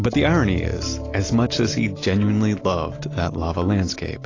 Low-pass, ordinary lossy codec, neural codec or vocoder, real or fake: 7.2 kHz; AAC, 32 kbps; none; real